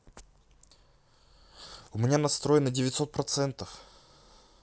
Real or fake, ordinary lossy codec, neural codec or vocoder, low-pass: real; none; none; none